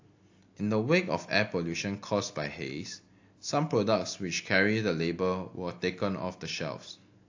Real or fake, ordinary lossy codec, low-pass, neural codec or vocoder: real; AAC, 48 kbps; 7.2 kHz; none